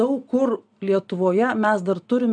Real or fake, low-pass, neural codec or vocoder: real; 9.9 kHz; none